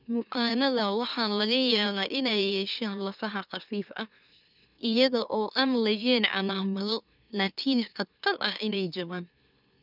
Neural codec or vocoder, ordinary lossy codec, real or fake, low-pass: autoencoder, 44.1 kHz, a latent of 192 numbers a frame, MeloTTS; none; fake; 5.4 kHz